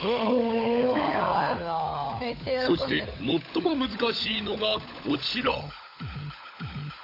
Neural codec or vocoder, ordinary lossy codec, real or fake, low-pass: codec, 16 kHz, 16 kbps, FunCodec, trained on LibriTTS, 50 frames a second; AAC, 32 kbps; fake; 5.4 kHz